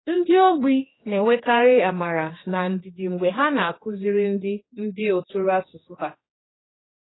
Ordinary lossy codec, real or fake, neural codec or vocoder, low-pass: AAC, 16 kbps; fake; codec, 16 kHz, 2 kbps, X-Codec, HuBERT features, trained on general audio; 7.2 kHz